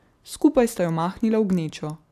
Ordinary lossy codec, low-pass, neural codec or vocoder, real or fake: AAC, 96 kbps; 14.4 kHz; none; real